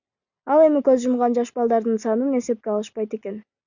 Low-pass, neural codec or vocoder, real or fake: 7.2 kHz; none; real